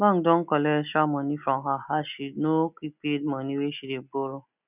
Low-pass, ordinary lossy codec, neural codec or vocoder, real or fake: 3.6 kHz; none; none; real